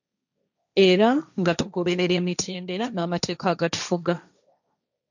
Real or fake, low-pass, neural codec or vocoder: fake; 7.2 kHz; codec, 16 kHz, 1.1 kbps, Voila-Tokenizer